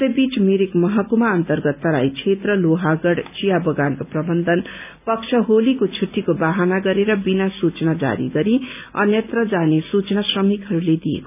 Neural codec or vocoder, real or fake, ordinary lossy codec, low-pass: none; real; none; 3.6 kHz